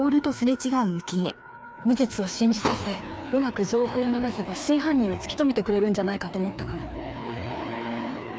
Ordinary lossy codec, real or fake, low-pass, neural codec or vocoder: none; fake; none; codec, 16 kHz, 2 kbps, FreqCodec, larger model